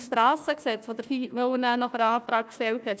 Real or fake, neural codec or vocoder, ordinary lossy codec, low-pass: fake; codec, 16 kHz, 1 kbps, FunCodec, trained on Chinese and English, 50 frames a second; none; none